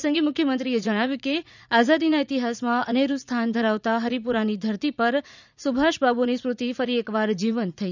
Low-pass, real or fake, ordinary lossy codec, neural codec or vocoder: 7.2 kHz; fake; none; vocoder, 44.1 kHz, 80 mel bands, Vocos